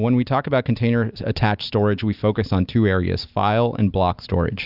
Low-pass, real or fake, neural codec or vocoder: 5.4 kHz; real; none